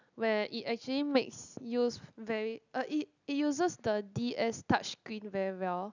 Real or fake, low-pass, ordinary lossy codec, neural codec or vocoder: real; 7.2 kHz; none; none